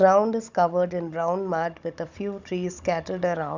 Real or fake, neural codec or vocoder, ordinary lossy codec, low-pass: fake; codec, 16 kHz, 16 kbps, FunCodec, trained on Chinese and English, 50 frames a second; none; 7.2 kHz